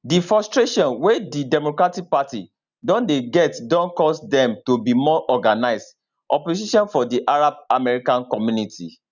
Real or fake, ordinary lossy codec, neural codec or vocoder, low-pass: real; none; none; 7.2 kHz